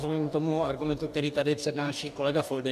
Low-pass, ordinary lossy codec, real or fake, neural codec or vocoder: 14.4 kHz; AAC, 96 kbps; fake; codec, 44.1 kHz, 2.6 kbps, DAC